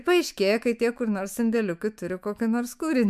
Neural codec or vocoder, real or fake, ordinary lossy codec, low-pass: autoencoder, 48 kHz, 128 numbers a frame, DAC-VAE, trained on Japanese speech; fake; MP3, 96 kbps; 14.4 kHz